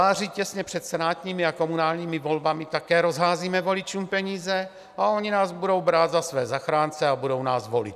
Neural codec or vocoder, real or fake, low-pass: none; real; 14.4 kHz